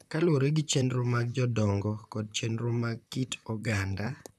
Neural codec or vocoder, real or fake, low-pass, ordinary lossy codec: vocoder, 44.1 kHz, 128 mel bands, Pupu-Vocoder; fake; 14.4 kHz; none